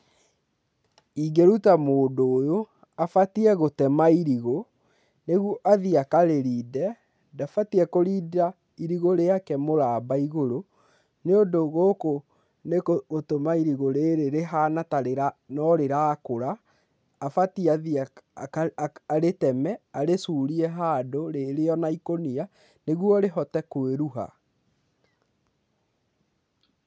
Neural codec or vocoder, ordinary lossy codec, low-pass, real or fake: none; none; none; real